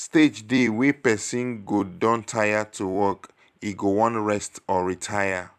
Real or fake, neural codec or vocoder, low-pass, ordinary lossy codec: fake; vocoder, 44.1 kHz, 128 mel bands every 256 samples, BigVGAN v2; 14.4 kHz; none